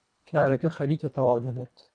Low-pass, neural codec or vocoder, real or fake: 9.9 kHz; codec, 24 kHz, 1.5 kbps, HILCodec; fake